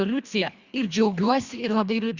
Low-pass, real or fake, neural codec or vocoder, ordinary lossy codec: 7.2 kHz; fake; codec, 24 kHz, 1.5 kbps, HILCodec; Opus, 64 kbps